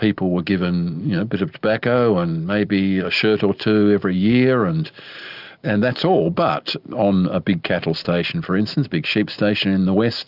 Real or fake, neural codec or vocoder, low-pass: real; none; 5.4 kHz